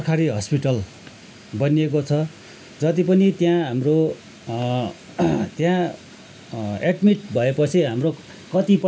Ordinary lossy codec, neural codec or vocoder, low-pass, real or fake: none; none; none; real